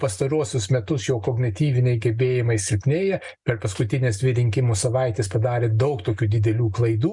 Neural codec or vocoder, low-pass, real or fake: none; 10.8 kHz; real